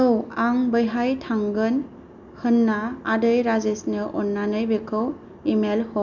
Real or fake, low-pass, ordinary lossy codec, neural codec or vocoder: real; 7.2 kHz; none; none